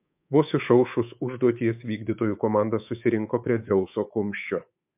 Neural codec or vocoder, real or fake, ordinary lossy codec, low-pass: codec, 24 kHz, 3.1 kbps, DualCodec; fake; MP3, 32 kbps; 3.6 kHz